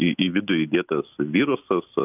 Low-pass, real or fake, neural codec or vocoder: 3.6 kHz; real; none